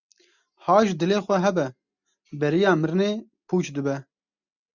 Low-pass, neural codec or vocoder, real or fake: 7.2 kHz; none; real